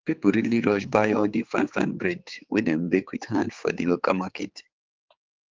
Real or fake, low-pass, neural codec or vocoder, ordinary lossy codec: fake; 7.2 kHz; codec, 16 kHz, 4 kbps, X-Codec, HuBERT features, trained on general audio; Opus, 32 kbps